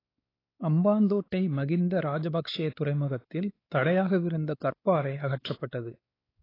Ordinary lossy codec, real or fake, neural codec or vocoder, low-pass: AAC, 24 kbps; fake; codec, 16 kHz, 4 kbps, X-Codec, WavLM features, trained on Multilingual LibriSpeech; 5.4 kHz